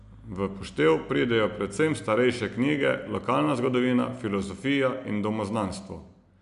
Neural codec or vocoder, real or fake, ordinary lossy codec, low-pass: none; real; MP3, 96 kbps; 10.8 kHz